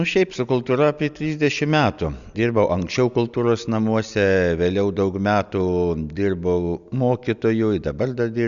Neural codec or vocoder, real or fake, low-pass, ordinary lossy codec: none; real; 7.2 kHz; Opus, 64 kbps